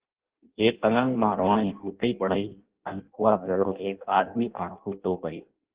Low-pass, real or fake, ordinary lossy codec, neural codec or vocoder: 3.6 kHz; fake; Opus, 16 kbps; codec, 16 kHz in and 24 kHz out, 0.6 kbps, FireRedTTS-2 codec